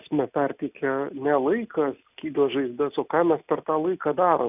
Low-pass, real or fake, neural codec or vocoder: 3.6 kHz; real; none